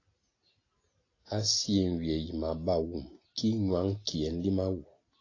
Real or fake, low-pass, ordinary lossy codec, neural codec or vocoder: real; 7.2 kHz; AAC, 32 kbps; none